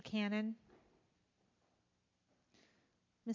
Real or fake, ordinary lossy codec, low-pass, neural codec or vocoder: real; MP3, 48 kbps; 7.2 kHz; none